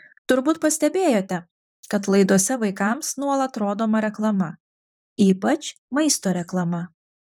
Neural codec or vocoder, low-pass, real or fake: vocoder, 44.1 kHz, 128 mel bands, Pupu-Vocoder; 19.8 kHz; fake